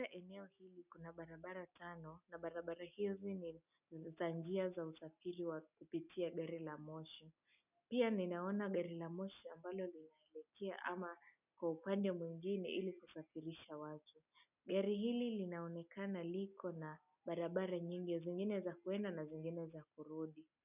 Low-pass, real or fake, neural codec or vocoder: 3.6 kHz; real; none